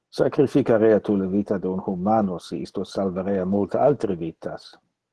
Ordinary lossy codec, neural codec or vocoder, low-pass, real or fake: Opus, 16 kbps; none; 10.8 kHz; real